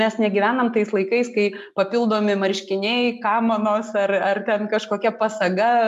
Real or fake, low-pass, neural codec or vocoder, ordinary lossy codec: real; 14.4 kHz; none; MP3, 96 kbps